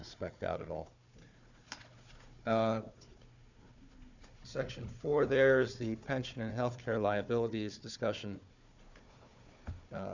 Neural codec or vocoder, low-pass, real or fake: codec, 16 kHz, 4 kbps, FunCodec, trained on Chinese and English, 50 frames a second; 7.2 kHz; fake